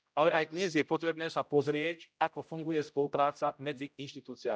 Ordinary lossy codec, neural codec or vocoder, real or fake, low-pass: none; codec, 16 kHz, 0.5 kbps, X-Codec, HuBERT features, trained on general audio; fake; none